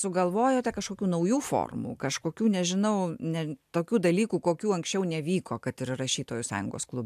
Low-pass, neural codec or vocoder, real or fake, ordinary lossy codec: 14.4 kHz; none; real; AAC, 96 kbps